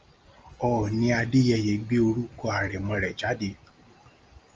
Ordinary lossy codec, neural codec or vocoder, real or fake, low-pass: Opus, 24 kbps; none; real; 7.2 kHz